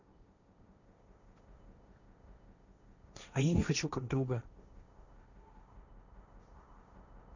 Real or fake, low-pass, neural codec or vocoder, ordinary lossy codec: fake; 7.2 kHz; codec, 16 kHz, 1.1 kbps, Voila-Tokenizer; none